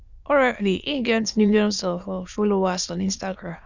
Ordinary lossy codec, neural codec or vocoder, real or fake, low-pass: Opus, 64 kbps; autoencoder, 22.05 kHz, a latent of 192 numbers a frame, VITS, trained on many speakers; fake; 7.2 kHz